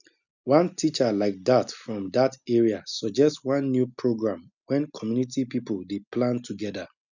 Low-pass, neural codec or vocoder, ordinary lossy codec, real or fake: 7.2 kHz; none; none; real